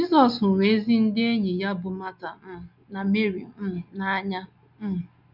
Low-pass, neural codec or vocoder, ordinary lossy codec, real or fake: 5.4 kHz; none; none; real